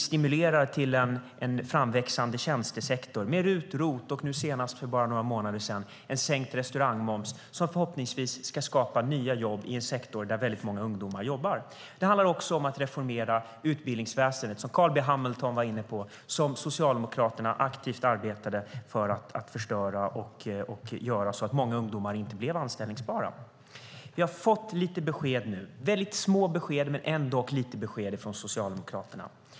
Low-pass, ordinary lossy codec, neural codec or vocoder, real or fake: none; none; none; real